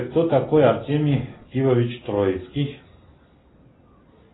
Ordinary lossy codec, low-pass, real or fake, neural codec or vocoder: AAC, 16 kbps; 7.2 kHz; real; none